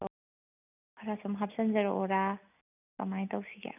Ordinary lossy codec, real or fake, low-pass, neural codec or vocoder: none; real; 3.6 kHz; none